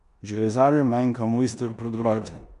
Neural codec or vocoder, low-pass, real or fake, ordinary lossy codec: codec, 16 kHz in and 24 kHz out, 0.9 kbps, LongCat-Audio-Codec, four codebook decoder; 10.8 kHz; fake; none